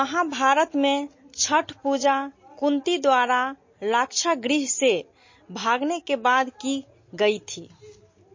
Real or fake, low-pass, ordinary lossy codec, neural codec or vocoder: real; 7.2 kHz; MP3, 32 kbps; none